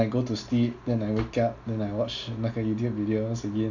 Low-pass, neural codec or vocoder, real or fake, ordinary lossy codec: 7.2 kHz; none; real; none